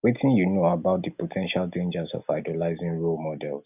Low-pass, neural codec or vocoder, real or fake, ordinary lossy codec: 3.6 kHz; none; real; none